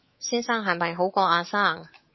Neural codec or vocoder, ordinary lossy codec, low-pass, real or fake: codec, 24 kHz, 3.1 kbps, DualCodec; MP3, 24 kbps; 7.2 kHz; fake